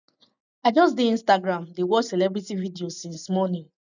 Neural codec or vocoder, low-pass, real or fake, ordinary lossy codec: none; 7.2 kHz; real; none